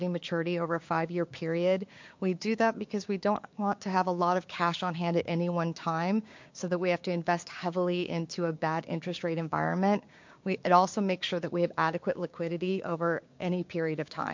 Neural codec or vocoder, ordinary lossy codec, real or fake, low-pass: codec, 16 kHz, 6 kbps, DAC; MP3, 48 kbps; fake; 7.2 kHz